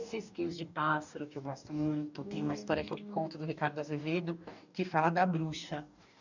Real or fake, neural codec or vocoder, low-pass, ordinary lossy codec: fake; codec, 44.1 kHz, 2.6 kbps, DAC; 7.2 kHz; none